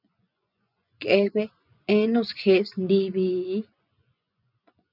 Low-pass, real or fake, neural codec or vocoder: 5.4 kHz; fake; vocoder, 24 kHz, 100 mel bands, Vocos